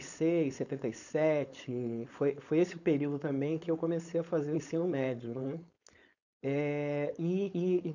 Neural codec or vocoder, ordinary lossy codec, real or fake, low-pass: codec, 16 kHz, 4.8 kbps, FACodec; none; fake; 7.2 kHz